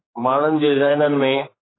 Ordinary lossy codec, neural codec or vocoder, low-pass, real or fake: AAC, 16 kbps; codec, 16 kHz, 4 kbps, X-Codec, HuBERT features, trained on general audio; 7.2 kHz; fake